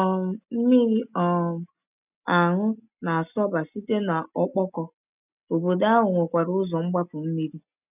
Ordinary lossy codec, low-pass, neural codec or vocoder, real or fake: none; 3.6 kHz; none; real